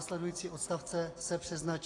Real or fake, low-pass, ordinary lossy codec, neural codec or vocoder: real; 10.8 kHz; AAC, 32 kbps; none